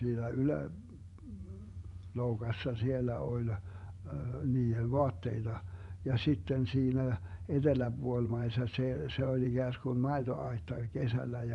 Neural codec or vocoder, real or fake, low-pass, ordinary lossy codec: vocoder, 24 kHz, 100 mel bands, Vocos; fake; 10.8 kHz; none